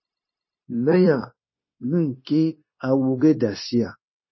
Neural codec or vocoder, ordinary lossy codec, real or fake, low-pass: codec, 16 kHz, 0.9 kbps, LongCat-Audio-Codec; MP3, 24 kbps; fake; 7.2 kHz